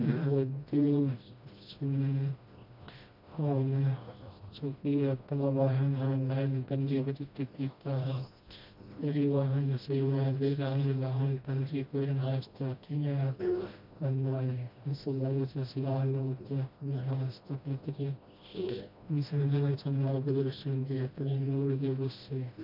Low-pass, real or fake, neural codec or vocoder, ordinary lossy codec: 5.4 kHz; fake; codec, 16 kHz, 1 kbps, FreqCodec, smaller model; MP3, 48 kbps